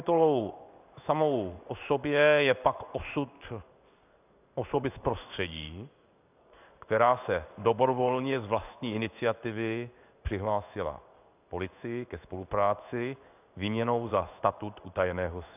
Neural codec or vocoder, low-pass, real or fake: codec, 16 kHz in and 24 kHz out, 1 kbps, XY-Tokenizer; 3.6 kHz; fake